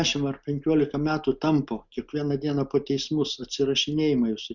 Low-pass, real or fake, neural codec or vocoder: 7.2 kHz; real; none